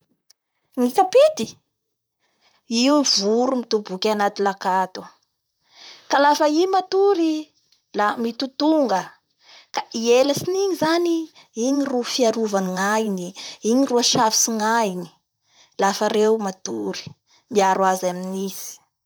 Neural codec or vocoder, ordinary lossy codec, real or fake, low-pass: vocoder, 44.1 kHz, 128 mel bands, Pupu-Vocoder; none; fake; none